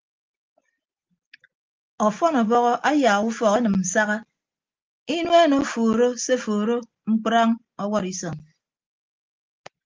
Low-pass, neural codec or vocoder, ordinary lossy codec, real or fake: 7.2 kHz; none; Opus, 32 kbps; real